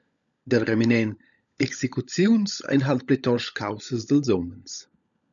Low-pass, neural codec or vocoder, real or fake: 7.2 kHz; codec, 16 kHz, 16 kbps, FunCodec, trained on LibriTTS, 50 frames a second; fake